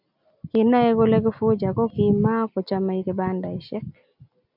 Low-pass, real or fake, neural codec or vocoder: 5.4 kHz; real; none